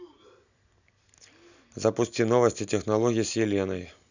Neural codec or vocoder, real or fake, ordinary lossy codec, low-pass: none; real; none; 7.2 kHz